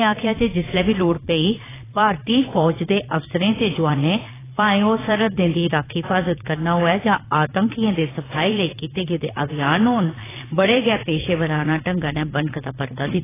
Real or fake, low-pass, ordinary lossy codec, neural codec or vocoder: fake; 3.6 kHz; AAC, 16 kbps; vocoder, 22.05 kHz, 80 mel bands, Vocos